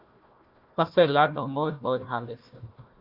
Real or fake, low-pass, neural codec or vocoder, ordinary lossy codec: fake; 5.4 kHz; codec, 16 kHz, 1 kbps, FunCodec, trained on Chinese and English, 50 frames a second; Opus, 64 kbps